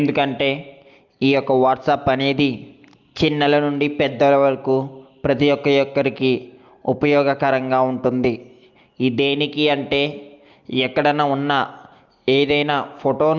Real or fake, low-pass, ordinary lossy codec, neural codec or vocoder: real; 7.2 kHz; Opus, 24 kbps; none